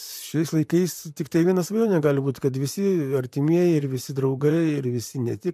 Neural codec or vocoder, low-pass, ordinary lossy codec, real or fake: vocoder, 44.1 kHz, 128 mel bands, Pupu-Vocoder; 14.4 kHz; AAC, 96 kbps; fake